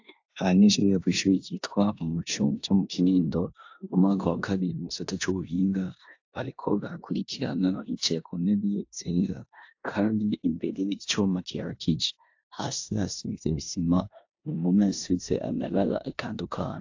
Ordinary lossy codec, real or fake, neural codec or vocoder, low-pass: AAC, 48 kbps; fake; codec, 16 kHz in and 24 kHz out, 0.9 kbps, LongCat-Audio-Codec, four codebook decoder; 7.2 kHz